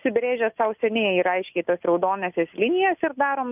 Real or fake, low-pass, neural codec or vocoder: real; 3.6 kHz; none